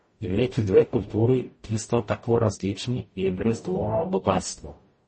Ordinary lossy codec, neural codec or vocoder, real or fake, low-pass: MP3, 32 kbps; codec, 44.1 kHz, 0.9 kbps, DAC; fake; 9.9 kHz